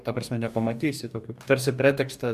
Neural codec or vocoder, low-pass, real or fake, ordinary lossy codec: codec, 44.1 kHz, 2.6 kbps, DAC; 14.4 kHz; fake; MP3, 96 kbps